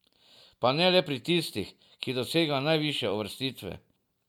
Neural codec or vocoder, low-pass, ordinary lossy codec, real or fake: none; 19.8 kHz; none; real